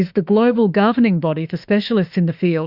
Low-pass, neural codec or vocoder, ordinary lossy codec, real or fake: 5.4 kHz; autoencoder, 48 kHz, 32 numbers a frame, DAC-VAE, trained on Japanese speech; Opus, 64 kbps; fake